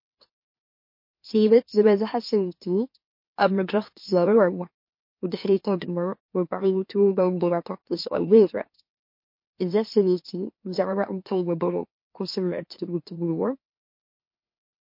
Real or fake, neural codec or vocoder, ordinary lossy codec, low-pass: fake; autoencoder, 44.1 kHz, a latent of 192 numbers a frame, MeloTTS; MP3, 32 kbps; 5.4 kHz